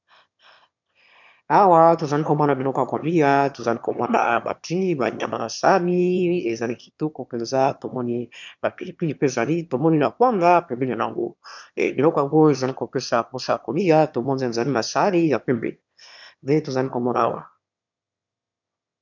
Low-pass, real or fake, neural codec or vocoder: 7.2 kHz; fake; autoencoder, 22.05 kHz, a latent of 192 numbers a frame, VITS, trained on one speaker